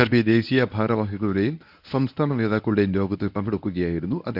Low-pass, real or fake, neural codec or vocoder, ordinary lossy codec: 5.4 kHz; fake; codec, 24 kHz, 0.9 kbps, WavTokenizer, medium speech release version 1; none